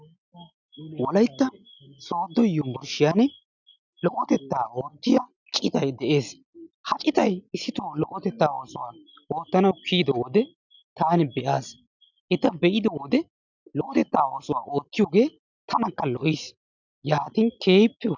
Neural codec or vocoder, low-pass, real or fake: none; 7.2 kHz; real